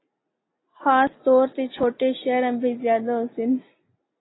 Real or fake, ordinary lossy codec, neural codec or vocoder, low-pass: real; AAC, 16 kbps; none; 7.2 kHz